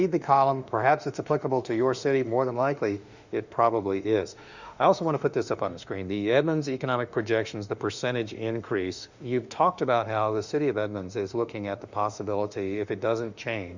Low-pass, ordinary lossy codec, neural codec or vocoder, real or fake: 7.2 kHz; Opus, 64 kbps; autoencoder, 48 kHz, 32 numbers a frame, DAC-VAE, trained on Japanese speech; fake